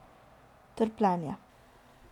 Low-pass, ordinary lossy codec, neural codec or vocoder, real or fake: 19.8 kHz; none; none; real